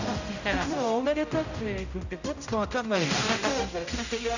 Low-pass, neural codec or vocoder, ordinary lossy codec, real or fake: 7.2 kHz; codec, 16 kHz, 0.5 kbps, X-Codec, HuBERT features, trained on general audio; none; fake